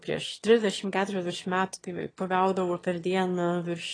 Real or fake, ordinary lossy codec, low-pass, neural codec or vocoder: fake; AAC, 32 kbps; 9.9 kHz; autoencoder, 22.05 kHz, a latent of 192 numbers a frame, VITS, trained on one speaker